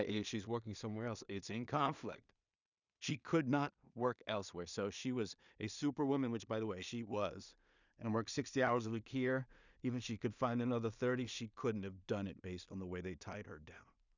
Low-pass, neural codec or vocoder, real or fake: 7.2 kHz; codec, 16 kHz in and 24 kHz out, 0.4 kbps, LongCat-Audio-Codec, two codebook decoder; fake